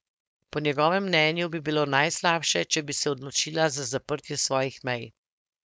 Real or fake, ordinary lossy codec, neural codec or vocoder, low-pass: fake; none; codec, 16 kHz, 4.8 kbps, FACodec; none